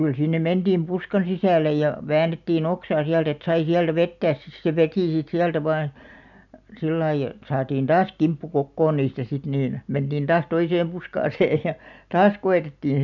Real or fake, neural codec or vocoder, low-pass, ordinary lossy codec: real; none; 7.2 kHz; none